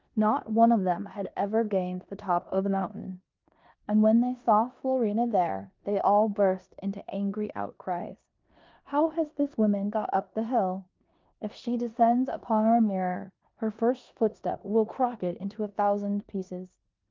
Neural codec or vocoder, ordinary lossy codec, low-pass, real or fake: codec, 16 kHz in and 24 kHz out, 0.9 kbps, LongCat-Audio-Codec, four codebook decoder; Opus, 24 kbps; 7.2 kHz; fake